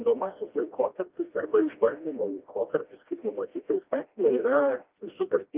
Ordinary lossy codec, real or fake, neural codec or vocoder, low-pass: Opus, 32 kbps; fake; codec, 16 kHz, 1 kbps, FreqCodec, smaller model; 3.6 kHz